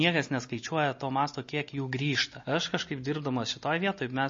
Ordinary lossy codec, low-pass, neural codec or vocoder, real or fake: MP3, 32 kbps; 7.2 kHz; none; real